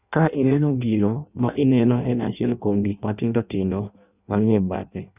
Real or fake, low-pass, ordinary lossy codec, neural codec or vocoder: fake; 3.6 kHz; none; codec, 16 kHz in and 24 kHz out, 0.6 kbps, FireRedTTS-2 codec